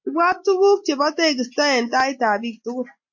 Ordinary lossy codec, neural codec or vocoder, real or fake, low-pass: MP3, 48 kbps; none; real; 7.2 kHz